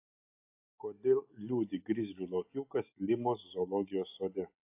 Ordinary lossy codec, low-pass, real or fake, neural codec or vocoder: AAC, 32 kbps; 3.6 kHz; real; none